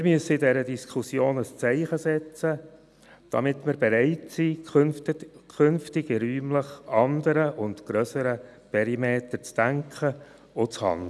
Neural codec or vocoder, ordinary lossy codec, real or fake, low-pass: none; none; real; none